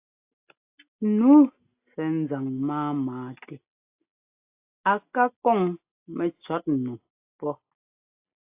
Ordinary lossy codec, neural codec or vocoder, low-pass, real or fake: AAC, 32 kbps; none; 3.6 kHz; real